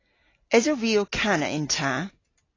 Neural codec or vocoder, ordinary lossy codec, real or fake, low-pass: none; AAC, 32 kbps; real; 7.2 kHz